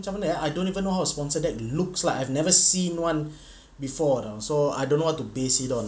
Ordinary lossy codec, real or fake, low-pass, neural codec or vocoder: none; real; none; none